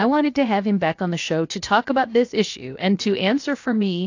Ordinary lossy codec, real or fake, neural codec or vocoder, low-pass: AAC, 48 kbps; fake; codec, 16 kHz, 0.7 kbps, FocalCodec; 7.2 kHz